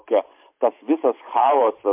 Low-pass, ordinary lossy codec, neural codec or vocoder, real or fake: 3.6 kHz; MP3, 32 kbps; vocoder, 44.1 kHz, 128 mel bands every 512 samples, BigVGAN v2; fake